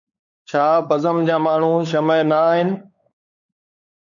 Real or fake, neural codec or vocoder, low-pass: fake; codec, 16 kHz, 4 kbps, X-Codec, WavLM features, trained on Multilingual LibriSpeech; 7.2 kHz